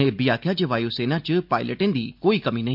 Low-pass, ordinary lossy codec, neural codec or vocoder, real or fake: 5.4 kHz; MP3, 48 kbps; none; real